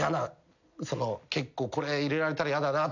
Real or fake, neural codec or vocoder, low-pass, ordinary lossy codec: real; none; 7.2 kHz; none